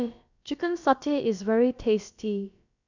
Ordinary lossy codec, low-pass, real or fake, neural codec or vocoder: none; 7.2 kHz; fake; codec, 16 kHz, about 1 kbps, DyCAST, with the encoder's durations